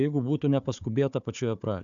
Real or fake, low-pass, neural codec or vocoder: fake; 7.2 kHz; codec, 16 kHz, 4 kbps, FunCodec, trained on Chinese and English, 50 frames a second